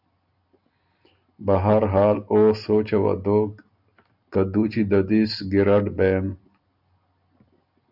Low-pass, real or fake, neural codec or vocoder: 5.4 kHz; real; none